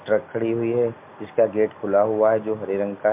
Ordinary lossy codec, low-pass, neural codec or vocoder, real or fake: MP3, 32 kbps; 3.6 kHz; none; real